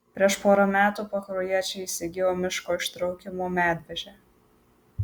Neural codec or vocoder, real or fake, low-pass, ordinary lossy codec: none; real; 19.8 kHz; Opus, 64 kbps